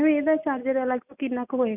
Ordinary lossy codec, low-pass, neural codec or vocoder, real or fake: none; 3.6 kHz; none; real